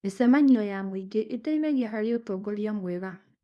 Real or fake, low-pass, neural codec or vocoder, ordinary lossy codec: fake; none; codec, 24 kHz, 0.9 kbps, WavTokenizer, small release; none